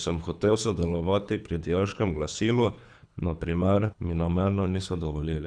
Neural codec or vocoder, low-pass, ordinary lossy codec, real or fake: codec, 24 kHz, 3 kbps, HILCodec; 9.9 kHz; none; fake